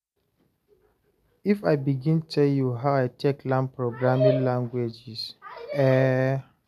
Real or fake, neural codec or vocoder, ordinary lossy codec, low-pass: real; none; none; 14.4 kHz